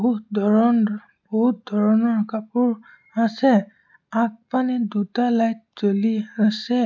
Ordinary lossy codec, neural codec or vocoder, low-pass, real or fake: none; none; 7.2 kHz; real